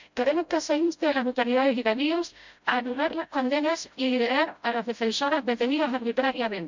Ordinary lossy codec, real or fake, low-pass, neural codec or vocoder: MP3, 64 kbps; fake; 7.2 kHz; codec, 16 kHz, 0.5 kbps, FreqCodec, smaller model